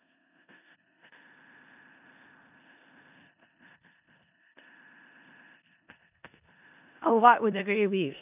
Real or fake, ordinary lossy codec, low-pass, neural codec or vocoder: fake; none; 3.6 kHz; codec, 16 kHz in and 24 kHz out, 0.4 kbps, LongCat-Audio-Codec, four codebook decoder